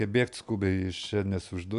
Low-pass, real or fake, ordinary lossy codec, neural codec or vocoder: 10.8 kHz; real; AAC, 96 kbps; none